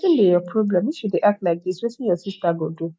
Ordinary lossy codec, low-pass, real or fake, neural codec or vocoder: none; none; real; none